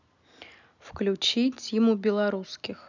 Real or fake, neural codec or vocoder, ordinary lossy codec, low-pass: real; none; none; 7.2 kHz